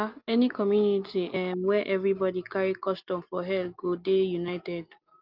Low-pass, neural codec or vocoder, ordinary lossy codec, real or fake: 5.4 kHz; none; Opus, 24 kbps; real